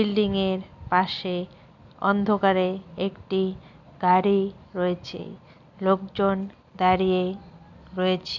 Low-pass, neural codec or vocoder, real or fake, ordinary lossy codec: 7.2 kHz; none; real; none